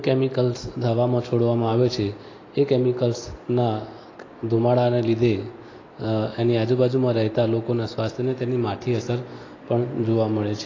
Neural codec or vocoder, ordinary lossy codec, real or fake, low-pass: none; AAC, 32 kbps; real; 7.2 kHz